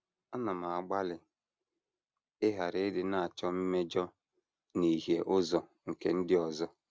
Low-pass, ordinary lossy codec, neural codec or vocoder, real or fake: none; none; none; real